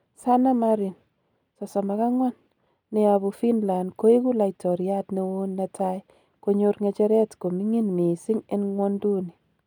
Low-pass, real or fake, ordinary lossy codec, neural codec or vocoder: 19.8 kHz; real; none; none